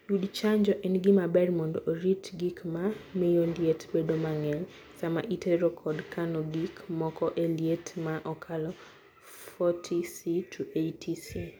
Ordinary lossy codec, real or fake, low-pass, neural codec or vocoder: none; real; none; none